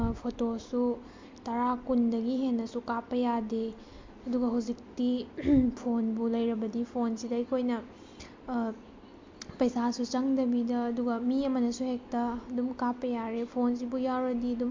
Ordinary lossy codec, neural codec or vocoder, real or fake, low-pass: MP3, 48 kbps; none; real; 7.2 kHz